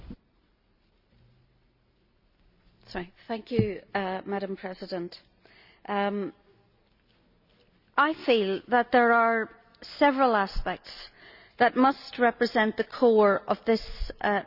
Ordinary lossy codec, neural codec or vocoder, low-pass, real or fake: Opus, 64 kbps; none; 5.4 kHz; real